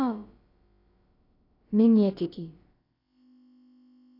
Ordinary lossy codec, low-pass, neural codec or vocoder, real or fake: AAC, 32 kbps; 5.4 kHz; codec, 16 kHz, about 1 kbps, DyCAST, with the encoder's durations; fake